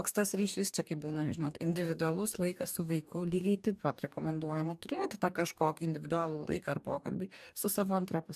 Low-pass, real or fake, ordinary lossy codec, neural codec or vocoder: 14.4 kHz; fake; AAC, 96 kbps; codec, 44.1 kHz, 2.6 kbps, DAC